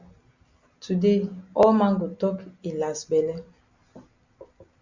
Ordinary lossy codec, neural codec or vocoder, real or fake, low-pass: Opus, 64 kbps; none; real; 7.2 kHz